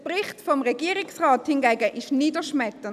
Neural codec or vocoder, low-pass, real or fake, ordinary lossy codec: none; 14.4 kHz; real; none